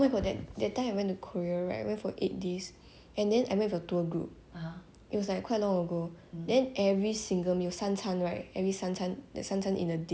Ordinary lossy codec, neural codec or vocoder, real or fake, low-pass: none; none; real; none